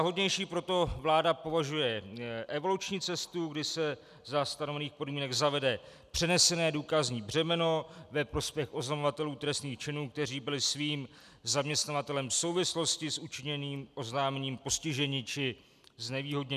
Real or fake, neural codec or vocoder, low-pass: real; none; 14.4 kHz